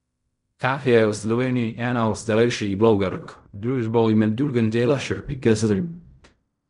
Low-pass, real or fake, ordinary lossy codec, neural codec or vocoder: 10.8 kHz; fake; none; codec, 16 kHz in and 24 kHz out, 0.4 kbps, LongCat-Audio-Codec, fine tuned four codebook decoder